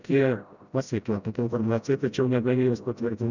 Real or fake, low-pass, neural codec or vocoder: fake; 7.2 kHz; codec, 16 kHz, 0.5 kbps, FreqCodec, smaller model